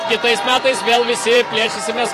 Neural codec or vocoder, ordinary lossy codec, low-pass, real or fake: vocoder, 44.1 kHz, 128 mel bands every 512 samples, BigVGAN v2; AAC, 48 kbps; 14.4 kHz; fake